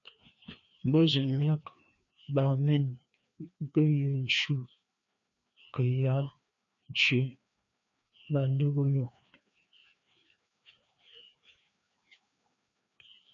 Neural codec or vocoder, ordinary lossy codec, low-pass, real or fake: codec, 16 kHz, 2 kbps, FreqCodec, larger model; AAC, 64 kbps; 7.2 kHz; fake